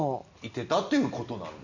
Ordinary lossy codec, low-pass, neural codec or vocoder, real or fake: none; 7.2 kHz; none; real